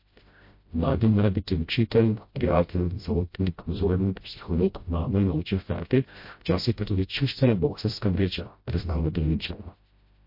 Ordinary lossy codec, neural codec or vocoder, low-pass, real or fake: MP3, 32 kbps; codec, 16 kHz, 0.5 kbps, FreqCodec, smaller model; 5.4 kHz; fake